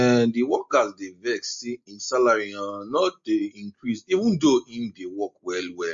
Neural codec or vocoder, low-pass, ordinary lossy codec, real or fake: none; 7.2 kHz; MP3, 48 kbps; real